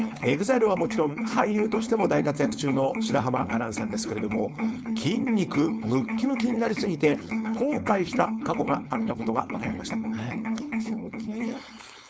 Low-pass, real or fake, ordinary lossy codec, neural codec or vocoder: none; fake; none; codec, 16 kHz, 4.8 kbps, FACodec